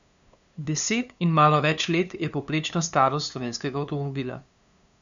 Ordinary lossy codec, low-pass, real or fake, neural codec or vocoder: none; 7.2 kHz; fake; codec, 16 kHz, 2 kbps, FunCodec, trained on LibriTTS, 25 frames a second